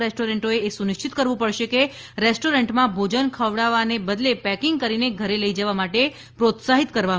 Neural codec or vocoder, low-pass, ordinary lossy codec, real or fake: none; 7.2 kHz; Opus, 24 kbps; real